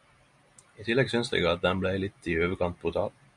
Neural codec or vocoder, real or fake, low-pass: none; real; 10.8 kHz